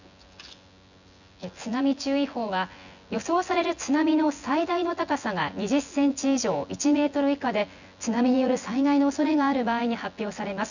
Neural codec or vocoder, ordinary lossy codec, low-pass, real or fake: vocoder, 24 kHz, 100 mel bands, Vocos; none; 7.2 kHz; fake